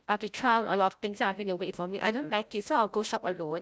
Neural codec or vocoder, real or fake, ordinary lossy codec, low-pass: codec, 16 kHz, 0.5 kbps, FreqCodec, larger model; fake; none; none